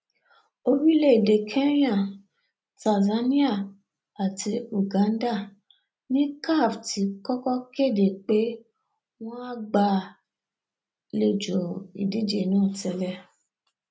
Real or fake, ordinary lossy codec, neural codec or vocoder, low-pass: real; none; none; none